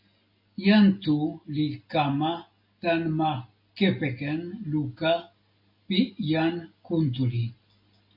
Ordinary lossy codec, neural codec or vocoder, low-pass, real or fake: MP3, 32 kbps; none; 5.4 kHz; real